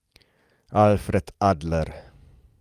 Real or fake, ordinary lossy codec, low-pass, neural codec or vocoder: real; Opus, 32 kbps; 14.4 kHz; none